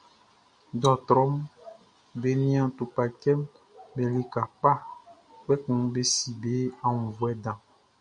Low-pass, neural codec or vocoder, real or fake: 9.9 kHz; none; real